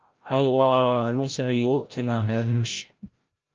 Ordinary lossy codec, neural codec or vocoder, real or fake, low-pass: Opus, 24 kbps; codec, 16 kHz, 0.5 kbps, FreqCodec, larger model; fake; 7.2 kHz